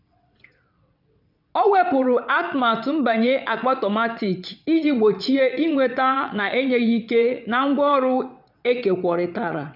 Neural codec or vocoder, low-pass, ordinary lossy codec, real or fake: vocoder, 22.05 kHz, 80 mel bands, WaveNeXt; 5.4 kHz; none; fake